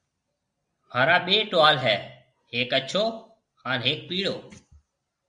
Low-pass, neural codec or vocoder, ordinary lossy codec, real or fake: 9.9 kHz; none; Opus, 64 kbps; real